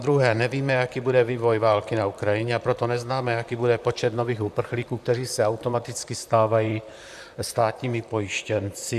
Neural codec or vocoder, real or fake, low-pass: vocoder, 44.1 kHz, 128 mel bands, Pupu-Vocoder; fake; 14.4 kHz